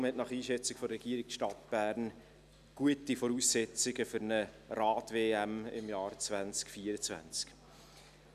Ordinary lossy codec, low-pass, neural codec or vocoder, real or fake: none; 14.4 kHz; none; real